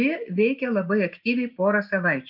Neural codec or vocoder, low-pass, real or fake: none; 5.4 kHz; real